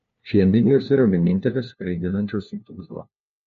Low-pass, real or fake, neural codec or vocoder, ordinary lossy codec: 7.2 kHz; fake; codec, 16 kHz, 1 kbps, FunCodec, trained on LibriTTS, 50 frames a second; MP3, 48 kbps